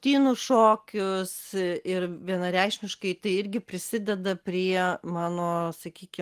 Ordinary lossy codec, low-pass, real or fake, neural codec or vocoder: Opus, 24 kbps; 14.4 kHz; real; none